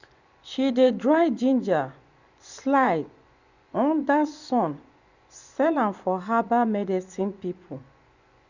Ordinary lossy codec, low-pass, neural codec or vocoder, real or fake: Opus, 64 kbps; 7.2 kHz; vocoder, 24 kHz, 100 mel bands, Vocos; fake